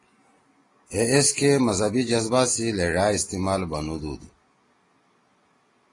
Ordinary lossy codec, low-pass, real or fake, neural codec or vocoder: AAC, 32 kbps; 10.8 kHz; real; none